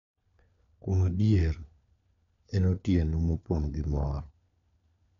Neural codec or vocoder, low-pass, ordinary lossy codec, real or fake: codec, 16 kHz, 4 kbps, FunCodec, trained on LibriTTS, 50 frames a second; 7.2 kHz; none; fake